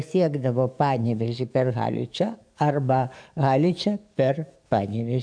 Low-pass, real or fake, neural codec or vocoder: 9.9 kHz; fake; codec, 44.1 kHz, 7.8 kbps, DAC